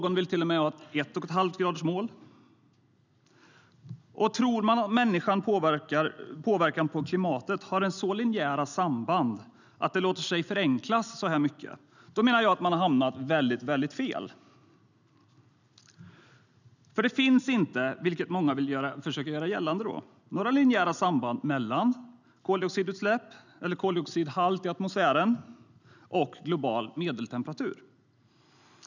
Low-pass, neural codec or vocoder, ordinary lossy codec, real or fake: 7.2 kHz; none; none; real